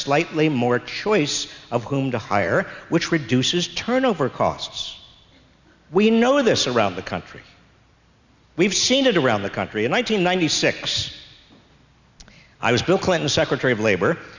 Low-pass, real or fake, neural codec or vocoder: 7.2 kHz; real; none